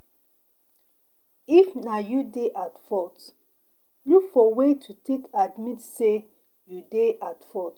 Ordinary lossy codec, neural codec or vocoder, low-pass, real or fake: Opus, 32 kbps; none; 19.8 kHz; real